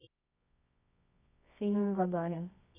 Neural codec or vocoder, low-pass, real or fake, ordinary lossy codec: codec, 24 kHz, 0.9 kbps, WavTokenizer, medium music audio release; 3.6 kHz; fake; none